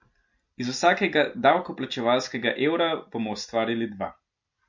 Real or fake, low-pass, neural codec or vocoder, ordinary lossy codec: real; 7.2 kHz; none; MP3, 48 kbps